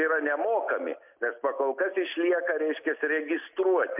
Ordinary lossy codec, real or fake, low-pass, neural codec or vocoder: MP3, 32 kbps; real; 3.6 kHz; none